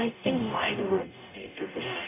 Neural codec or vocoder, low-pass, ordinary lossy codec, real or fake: codec, 44.1 kHz, 0.9 kbps, DAC; 3.6 kHz; none; fake